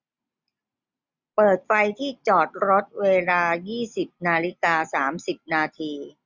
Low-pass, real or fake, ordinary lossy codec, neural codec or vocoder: 7.2 kHz; real; Opus, 64 kbps; none